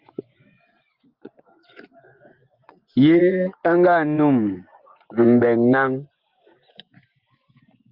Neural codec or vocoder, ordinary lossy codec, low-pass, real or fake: vocoder, 24 kHz, 100 mel bands, Vocos; Opus, 32 kbps; 5.4 kHz; fake